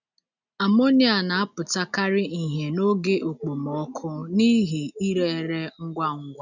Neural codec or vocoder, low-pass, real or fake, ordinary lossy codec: none; 7.2 kHz; real; none